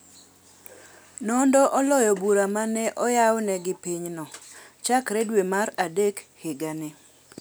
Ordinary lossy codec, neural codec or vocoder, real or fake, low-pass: none; none; real; none